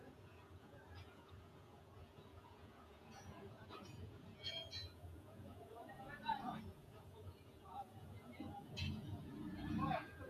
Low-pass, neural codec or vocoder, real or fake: 14.4 kHz; vocoder, 48 kHz, 128 mel bands, Vocos; fake